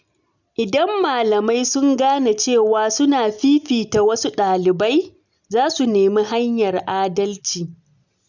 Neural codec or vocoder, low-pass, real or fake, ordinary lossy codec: none; 7.2 kHz; real; none